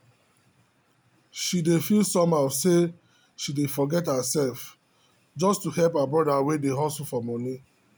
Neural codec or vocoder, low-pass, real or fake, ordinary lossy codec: none; none; real; none